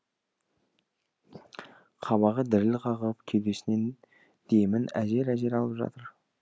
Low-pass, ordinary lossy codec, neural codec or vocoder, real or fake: none; none; none; real